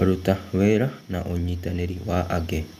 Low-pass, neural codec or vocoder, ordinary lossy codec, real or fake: 14.4 kHz; none; none; real